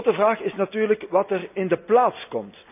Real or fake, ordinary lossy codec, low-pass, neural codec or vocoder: real; none; 3.6 kHz; none